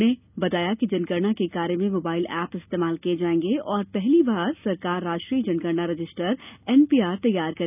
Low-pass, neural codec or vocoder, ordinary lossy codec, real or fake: 3.6 kHz; none; none; real